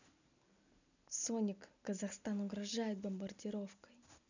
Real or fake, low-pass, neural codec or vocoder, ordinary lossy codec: real; 7.2 kHz; none; none